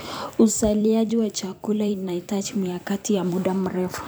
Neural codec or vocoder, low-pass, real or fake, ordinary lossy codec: vocoder, 44.1 kHz, 128 mel bands every 256 samples, BigVGAN v2; none; fake; none